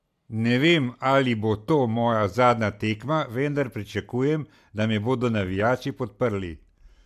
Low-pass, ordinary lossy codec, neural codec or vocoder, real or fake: 14.4 kHz; MP3, 96 kbps; none; real